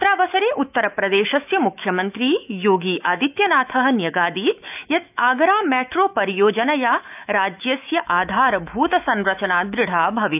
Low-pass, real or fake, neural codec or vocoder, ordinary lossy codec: 3.6 kHz; fake; autoencoder, 48 kHz, 128 numbers a frame, DAC-VAE, trained on Japanese speech; none